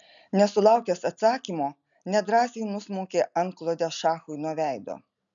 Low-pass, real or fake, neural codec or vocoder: 7.2 kHz; real; none